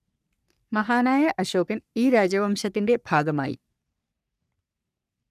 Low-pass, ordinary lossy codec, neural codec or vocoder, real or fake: 14.4 kHz; none; codec, 44.1 kHz, 3.4 kbps, Pupu-Codec; fake